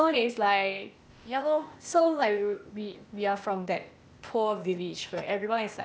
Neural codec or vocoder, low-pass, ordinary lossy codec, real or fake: codec, 16 kHz, 0.8 kbps, ZipCodec; none; none; fake